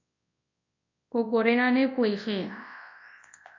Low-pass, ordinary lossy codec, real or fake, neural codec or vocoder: 7.2 kHz; AAC, 48 kbps; fake; codec, 24 kHz, 0.9 kbps, WavTokenizer, large speech release